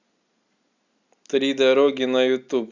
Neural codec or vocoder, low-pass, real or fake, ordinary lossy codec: none; 7.2 kHz; real; Opus, 64 kbps